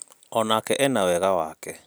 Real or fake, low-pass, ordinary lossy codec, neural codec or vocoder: real; none; none; none